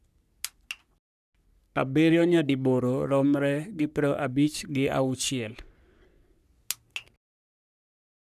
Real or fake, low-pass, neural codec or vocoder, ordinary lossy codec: fake; 14.4 kHz; codec, 44.1 kHz, 3.4 kbps, Pupu-Codec; none